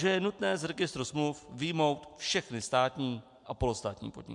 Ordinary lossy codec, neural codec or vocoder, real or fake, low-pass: MP3, 64 kbps; none; real; 10.8 kHz